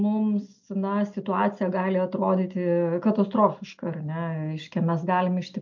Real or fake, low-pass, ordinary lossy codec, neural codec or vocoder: real; 7.2 kHz; MP3, 48 kbps; none